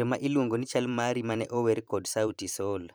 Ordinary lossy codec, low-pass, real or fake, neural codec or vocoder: none; none; fake; vocoder, 44.1 kHz, 128 mel bands every 512 samples, BigVGAN v2